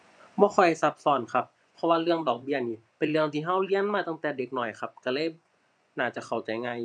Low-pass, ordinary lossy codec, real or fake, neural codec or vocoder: 9.9 kHz; none; fake; vocoder, 24 kHz, 100 mel bands, Vocos